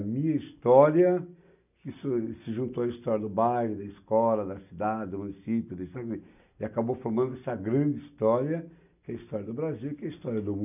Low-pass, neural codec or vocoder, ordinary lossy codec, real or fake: 3.6 kHz; none; none; real